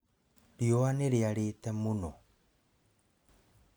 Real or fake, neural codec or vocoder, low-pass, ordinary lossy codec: real; none; none; none